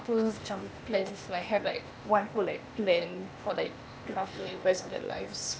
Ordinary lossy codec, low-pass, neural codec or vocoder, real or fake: none; none; codec, 16 kHz, 0.8 kbps, ZipCodec; fake